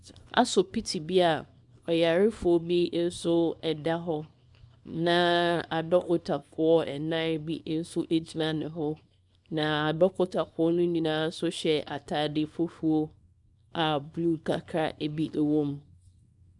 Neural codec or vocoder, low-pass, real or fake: codec, 24 kHz, 0.9 kbps, WavTokenizer, small release; 10.8 kHz; fake